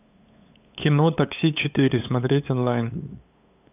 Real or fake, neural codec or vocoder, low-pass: fake; codec, 16 kHz, 8 kbps, FunCodec, trained on LibriTTS, 25 frames a second; 3.6 kHz